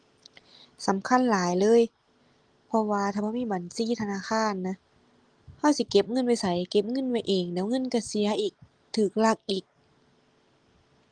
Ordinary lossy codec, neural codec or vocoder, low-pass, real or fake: Opus, 24 kbps; none; 9.9 kHz; real